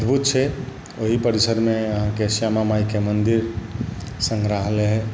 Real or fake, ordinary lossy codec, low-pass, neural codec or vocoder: real; none; none; none